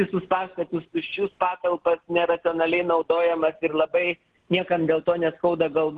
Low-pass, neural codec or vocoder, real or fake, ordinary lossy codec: 10.8 kHz; none; real; Opus, 16 kbps